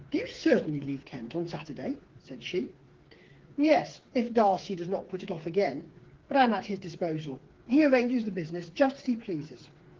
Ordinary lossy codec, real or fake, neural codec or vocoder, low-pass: Opus, 16 kbps; fake; codec, 16 kHz, 4 kbps, FreqCodec, smaller model; 7.2 kHz